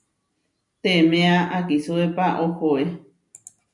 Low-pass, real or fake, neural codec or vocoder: 10.8 kHz; real; none